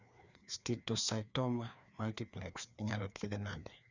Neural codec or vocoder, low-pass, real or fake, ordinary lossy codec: codec, 16 kHz, 2 kbps, FreqCodec, larger model; 7.2 kHz; fake; none